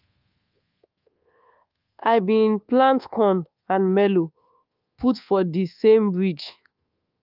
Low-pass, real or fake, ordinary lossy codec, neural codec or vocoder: 5.4 kHz; fake; Opus, 24 kbps; codec, 24 kHz, 1.2 kbps, DualCodec